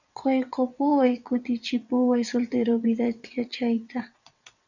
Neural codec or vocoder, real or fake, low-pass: vocoder, 22.05 kHz, 80 mel bands, WaveNeXt; fake; 7.2 kHz